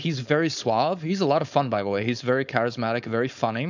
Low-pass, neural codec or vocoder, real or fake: 7.2 kHz; codec, 16 kHz, 4.8 kbps, FACodec; fake